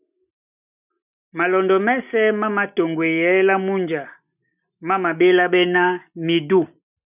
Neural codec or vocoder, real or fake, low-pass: none; real; 3.6 kHz